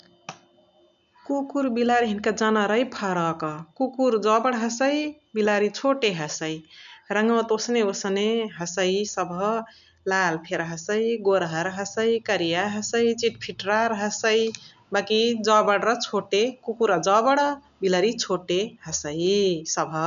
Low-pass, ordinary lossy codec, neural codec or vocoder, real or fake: 7.2 kHz; none; none; real